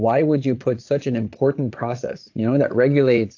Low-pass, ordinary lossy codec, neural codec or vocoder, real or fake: 7.2 kHz; AAC, 48 kbps; vocoder, 22.05 kHz, 80 mel bands, Vocos; fake